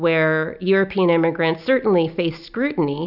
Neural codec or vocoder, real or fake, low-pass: none; real; 5.4 kHz